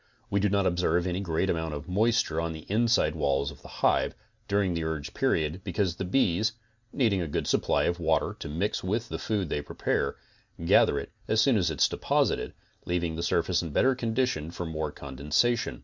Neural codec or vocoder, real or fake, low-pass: none; real; 7.2 kHz